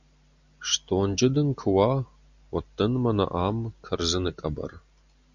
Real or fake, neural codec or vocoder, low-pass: real; none; 7.2 kHz